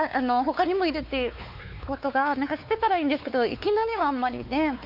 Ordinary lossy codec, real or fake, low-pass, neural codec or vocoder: none; fake; 5.4 kHz; codec, 16 kHz, 4 kbps, X-Codec, WavLM features, trained on Multilingual LibriSpeech